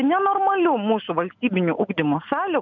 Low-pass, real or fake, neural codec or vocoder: 7.2 kHz; real; none